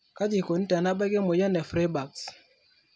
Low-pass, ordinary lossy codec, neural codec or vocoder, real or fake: none; none; none; real